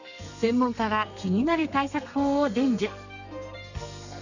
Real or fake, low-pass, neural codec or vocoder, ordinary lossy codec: fake; 7.2 kHz; codec, 32 kHz, 1.9 kbps, SNAC; none